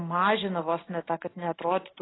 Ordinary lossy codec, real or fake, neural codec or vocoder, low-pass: AAC, 16 kbps; real; none; 7.2 kHz